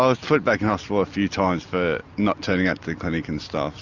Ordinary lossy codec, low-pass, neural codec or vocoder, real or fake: Opus, 64 kbps; 7.2 kHz; none; real